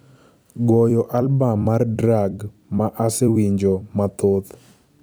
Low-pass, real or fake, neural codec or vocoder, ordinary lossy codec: none; fake; vocoder, 44.1 kHz, 128 mel bands every 256 samples, BigVGAN v2; none